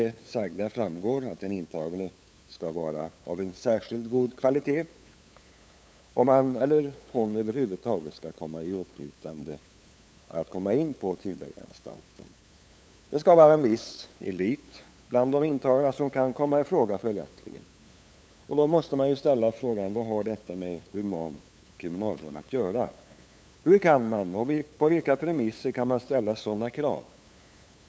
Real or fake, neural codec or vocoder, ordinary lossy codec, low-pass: fake; codec, 16 kHz, 8 kbps, FunCodec, trained on LibriTTS, 25 frames a second; none; none